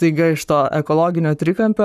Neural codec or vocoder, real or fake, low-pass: codec, 44.1 kHz, 7.8 kbps, Pupu-Codec; fake; 14.4 kHz